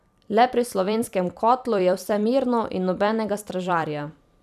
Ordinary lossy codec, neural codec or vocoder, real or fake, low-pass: none; vocoder, 44.1 kHz, 128 mel bands every 256 samples, BigVGAN v2; fake; 14.4 kHz